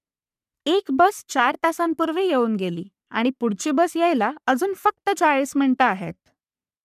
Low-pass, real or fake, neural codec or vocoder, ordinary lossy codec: 14.4 kHz; fake; codec, 44.1 kHz, 3.4 kbps, Pupu-Codec; none